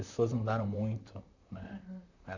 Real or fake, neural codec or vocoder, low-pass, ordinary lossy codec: fake; vocoder, 44.1 kHz, 128 mel bands, Pupu-Vocoder; 7.2 kHz; none